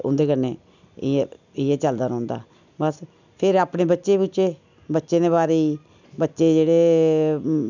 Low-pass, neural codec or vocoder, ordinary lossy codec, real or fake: 7.2 kHz; none; none; real